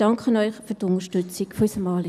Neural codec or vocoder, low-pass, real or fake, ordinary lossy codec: none; 14.4 kHz; real; none